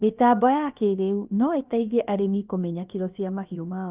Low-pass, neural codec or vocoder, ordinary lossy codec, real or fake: 3.6 kHz; codec, 16 kHz, about 1 kbps, DyCAST, with the encoder's durations; Opus, 32 kbps; fake